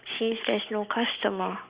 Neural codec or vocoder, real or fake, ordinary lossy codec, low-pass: none; real; Opus, 64 kbps; 3.6 kHz